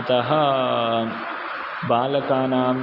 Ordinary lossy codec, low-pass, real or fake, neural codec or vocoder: MP3, 48 kbps; 5.4 kHz; real; none